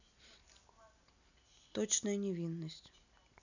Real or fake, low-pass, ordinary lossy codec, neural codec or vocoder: real; 7.2 kHz; none; none